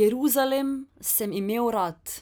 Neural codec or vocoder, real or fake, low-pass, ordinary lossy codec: none; real; none; none